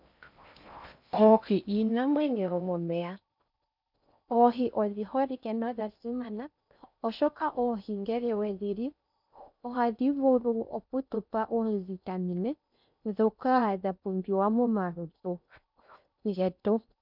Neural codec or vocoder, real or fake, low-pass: codec, 16 kHz in and 24 kHz out, 0.6 kbps, FocalCodec, streaming, 4096 codes; fake; 5.4 kHz